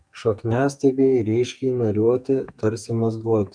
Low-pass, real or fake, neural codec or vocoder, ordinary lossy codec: 9.9 kHz; fake; codec, 44.1 kHz, 2.6 kbps, SNAC; MP3, 96 kbps